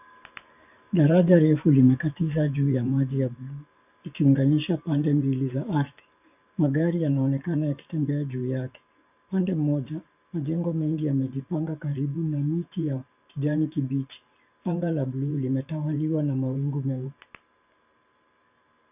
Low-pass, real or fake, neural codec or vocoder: 3.6 kHz; fake; vocoder, 44.1 kHz, 128 mel bands every 512 samples, BigVGAN v2